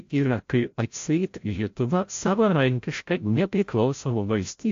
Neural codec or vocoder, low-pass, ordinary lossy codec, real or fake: codec, 16 kHz, 0.5 kbps, FreqCodec, larger model; 7.2 kHz; AAC, 48 kbps; fake